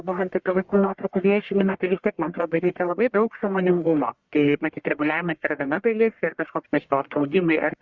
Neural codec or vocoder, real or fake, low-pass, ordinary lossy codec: codec, 44.1 kHz, 1.7 kbps, Pupu-Codec; fake; 7.2 kHz; Opus, 64 kbps